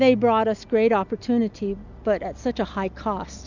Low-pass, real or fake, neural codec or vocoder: 7.2 kHz; real; none